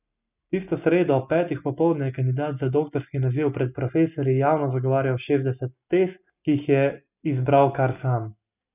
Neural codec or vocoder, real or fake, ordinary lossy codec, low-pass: none; real; none; 3.6 kHz